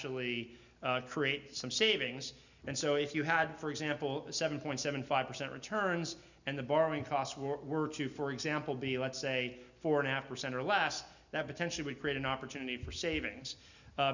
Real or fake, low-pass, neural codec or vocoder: real; 7.2 kHz; none